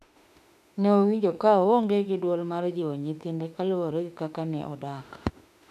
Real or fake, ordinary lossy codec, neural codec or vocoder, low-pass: fake; none; autoencoder, 48 kHz, 32 numbers a frame, DAC-VAE, trained on Japanese speech; 14.4 kHz